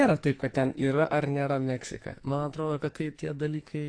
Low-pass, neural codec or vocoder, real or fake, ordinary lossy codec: 9.9 kHz; codec, 44.1 kHz, 2.6 kbps, SNAC; fake; AAC, 48 kbps